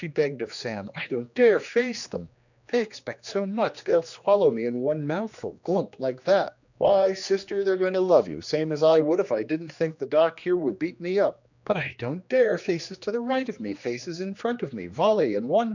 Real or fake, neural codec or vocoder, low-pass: fake; codec, 16 kHz, 2 kbps, X-Codec, HuBERT features, trained on general audio; 7.2 kHz